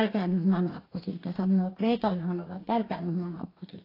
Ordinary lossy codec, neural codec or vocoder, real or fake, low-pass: AAC, 24 kbps; codec, 44.1 kHz, 2.6 kbps, DAC; fake; 5.4 kHz